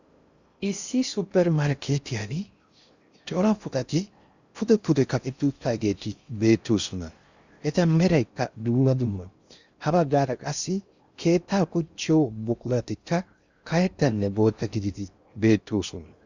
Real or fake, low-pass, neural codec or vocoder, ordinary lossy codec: fake; 7.2 kHz; codec, 16 kHz in and 24 kHz out, 0.6 kbps, FocalCodec, streaming, 4096 codes; Opus, 64 kbps